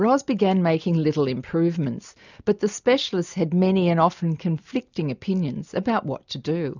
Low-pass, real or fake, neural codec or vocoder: 7.2 kHz; real; none